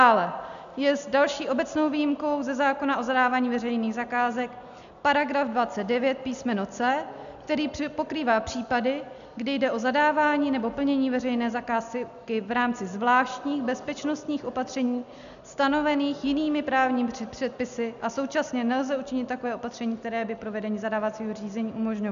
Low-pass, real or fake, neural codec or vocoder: 7.2 kHz; real; none